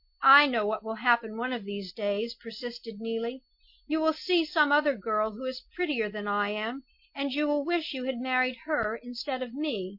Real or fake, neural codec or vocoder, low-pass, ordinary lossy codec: real; none; 5.4 kHz; MP3, 32 kbps